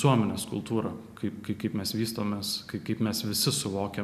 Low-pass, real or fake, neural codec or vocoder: 14.4 kHz; real; none